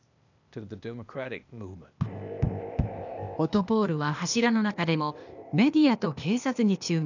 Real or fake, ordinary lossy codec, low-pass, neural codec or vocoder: fake; none; 7.2 kHz; codec, 16 kHz, 0.8 kbps, ZipCodec